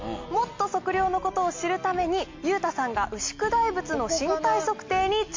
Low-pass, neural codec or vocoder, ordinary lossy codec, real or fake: 7.2 kHz; none; MP3, 48 kbps; real